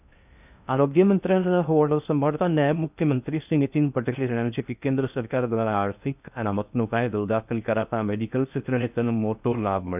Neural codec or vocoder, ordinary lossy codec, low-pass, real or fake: codec, 16 kHz in and 24 kHz out, 0.6 kbps, FocalCodec, streaming, 2048 codes; none; 3.6 kHz; fake